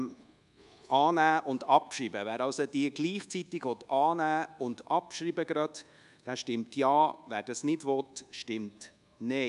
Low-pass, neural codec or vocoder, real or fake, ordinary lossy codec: 10.8 kHz; codec, 24 kHz, 1.2 kbps, DualCodec; fake; none